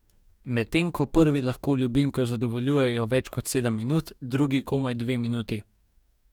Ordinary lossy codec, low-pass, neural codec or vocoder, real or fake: none; 19.8 kHz; codec, 44.1 kHz, 2.6 kbps, DAC; fake